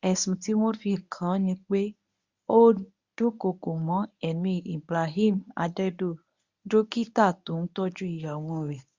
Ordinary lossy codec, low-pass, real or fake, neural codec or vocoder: Opus, 64 kbps; 7.2 kHz; fake; codec, 24 kHz, 0.9 kbps, WavTokenizer, medium speech release version 1